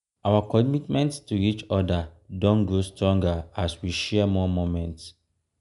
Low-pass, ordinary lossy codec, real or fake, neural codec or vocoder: 10.8 kHz; none; real; none